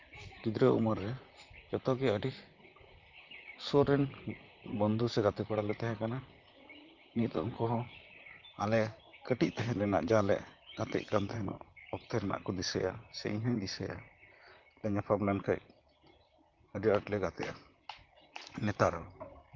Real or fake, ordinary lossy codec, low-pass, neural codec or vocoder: fake; Opus, 24 kbps; 7.2 kHz; vocoder, 44.1 kHz, 128 mel bands, Pupu-Vocoder